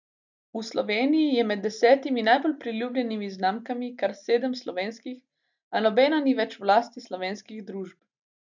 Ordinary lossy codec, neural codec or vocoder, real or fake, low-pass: none; none; real; 7.2 kHz